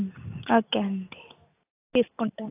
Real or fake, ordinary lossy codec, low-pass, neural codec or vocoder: real; AAC, 24 kbps; 3.6 kHz; none